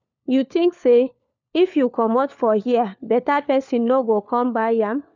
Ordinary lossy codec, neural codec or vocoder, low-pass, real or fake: none; codec, 16 kHz, 4 kbps, FunCodec, trained on LibriTTS, 50 frames a second; 7.2 kHz; fake